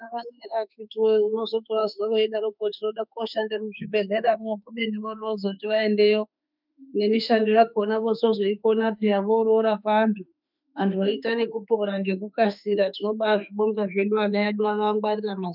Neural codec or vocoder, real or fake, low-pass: autoencoder, 48 kHz, 32 numbers a frame, DAC-VAE, trained on Japanese speech; fake; 5.4 kHz